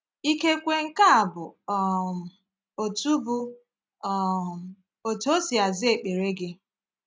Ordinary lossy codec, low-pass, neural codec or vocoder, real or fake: none; none; none; real